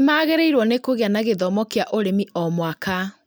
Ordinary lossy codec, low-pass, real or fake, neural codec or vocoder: none; none; real; none